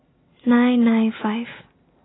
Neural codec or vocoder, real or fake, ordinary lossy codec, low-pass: none; real; AAC, 16 kbps; 7.2 kHz